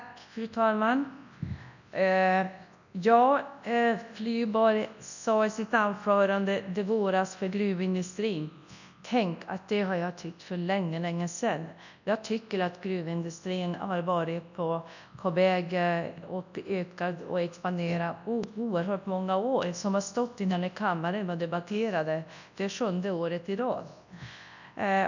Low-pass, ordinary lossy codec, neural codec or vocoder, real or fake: 7.2 kHz; none; codec, 24 kHz, 0.9 kbps, WavTokenizer, large speech release; fake